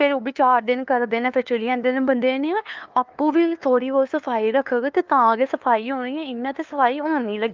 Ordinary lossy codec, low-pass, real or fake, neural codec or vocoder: Opus, 24 kbps; 7.2 kHz; fake; codec, 16 kHz, 2 kbps, FunCodec, trained on LibriTTS, 25 frames a second